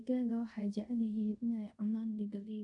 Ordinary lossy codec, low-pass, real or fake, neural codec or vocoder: none; 10.8 kHz; fake; codec, 24 kHz, 0.5 kbps, DualCodec